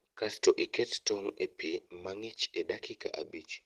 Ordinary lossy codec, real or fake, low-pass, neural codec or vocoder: Opus, 16 kbps; real; 14.4 kHz; none